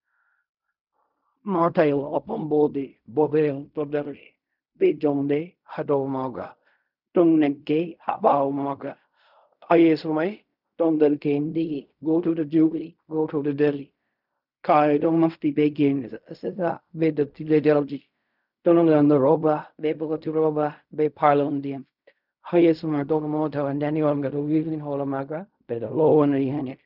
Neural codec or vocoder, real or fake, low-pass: codec, 16 kHz in and 24 kHz out, 0.4 kbps, LongCat-Audio-Codec, fine tuned four codebook decoder; fake; 5.4 kHz